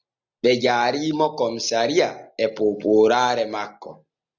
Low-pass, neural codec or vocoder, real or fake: 7.2 kHz; none; real